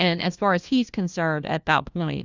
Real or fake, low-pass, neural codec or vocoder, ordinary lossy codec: fake; 7.2 kHz; codec, 16 kHz, 1 kbps, FunCodec, trained on LibriTTS, 50 frames a second; Opus, 64 kbps